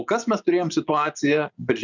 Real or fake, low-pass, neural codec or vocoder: fake; 7.2 kHz; vocoder, 24 kHz, 100 mel bands, Vocos